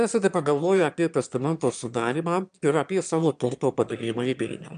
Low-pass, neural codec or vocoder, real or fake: 9.9 kHz; autoencoder, 22.05 kHz, a latent of 192 numbers a frame, VITS, trained on one speaker; fake